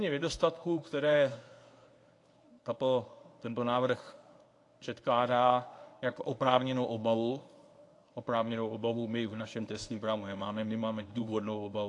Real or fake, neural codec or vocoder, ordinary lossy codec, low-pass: fake; codec, 24 kHz, 0.9 kbps, WavTokenizer, medium speech release version 1; AAC, 48 kbps; 10.8 kHz